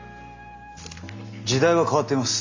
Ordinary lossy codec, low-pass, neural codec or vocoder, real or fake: AAC, 48 kbps; 7.2 kHz; none; real